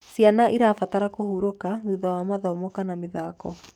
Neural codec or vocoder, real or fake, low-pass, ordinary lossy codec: codec, 44.1 kHz, 7.8 kbps, DAC; fake; 19.8 kHz; none